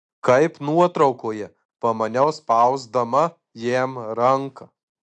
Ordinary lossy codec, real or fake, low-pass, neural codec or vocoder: AAC, 64 kbps; real; 9.9 kHz; none